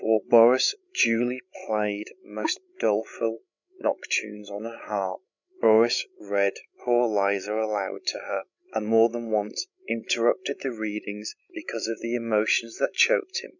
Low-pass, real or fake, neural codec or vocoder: 7.2 kHz; fake; codec, 16 kHz, 16 kbps, FreqCodec, larger model